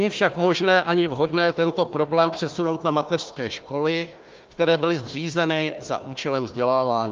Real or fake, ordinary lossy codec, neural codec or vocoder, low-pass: fake; Opus, 24 kbps; codec, 16 kHz, 1 kbps, FunCodec, trained on Chinese and English, 50 frames a second; 7.2 kHz